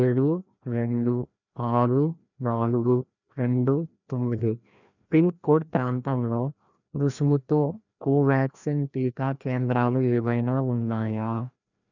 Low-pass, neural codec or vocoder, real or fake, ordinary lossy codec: 7.2 kHz; codec, 16 kHz, 1 kbps, FreqCodec, larger model; fake; none